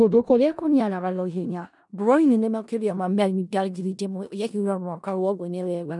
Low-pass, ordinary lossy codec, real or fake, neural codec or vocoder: 10.8 kHz; none; fake; codec, 16 kHz in and 24 kHz out, 0.4 kbps, LongCat-Audio-Codec, four codebook decoder